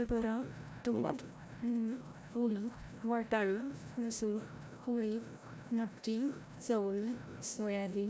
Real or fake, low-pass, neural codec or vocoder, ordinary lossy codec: fake; none; codec, 16 kHz, 0.5 kbps, FreqCodec, larger model; none